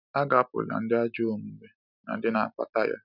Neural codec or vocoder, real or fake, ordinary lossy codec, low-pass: none; real; none; 5.4 kHz